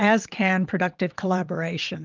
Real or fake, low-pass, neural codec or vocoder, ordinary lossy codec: fake; 7.2 kHz; vocoder, 22.05 kHz, 80 mel bands, WaveNeXt; Opus, 32 kbps